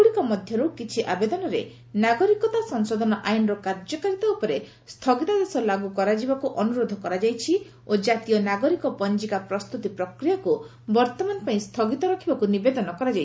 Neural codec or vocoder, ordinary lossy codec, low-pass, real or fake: none; none; none; real